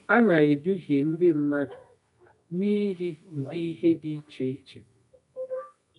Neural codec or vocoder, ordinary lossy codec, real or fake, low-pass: codec, 24 kHz, 0.9 kbps, WavTokenizer, medium music audio release; none; fake; 10.8 kHz